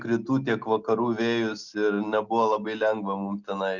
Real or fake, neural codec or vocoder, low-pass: real; none; 7.2 kHz